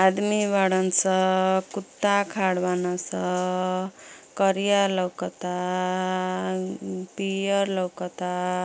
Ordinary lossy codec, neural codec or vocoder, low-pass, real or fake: none; none; none; real